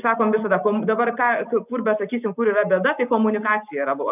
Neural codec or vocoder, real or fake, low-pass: none; real; 3.6 kHz